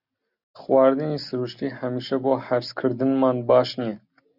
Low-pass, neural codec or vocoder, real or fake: 5.4 kHz; none; real